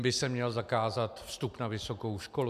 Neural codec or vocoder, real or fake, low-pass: none; real; 14.4 kHz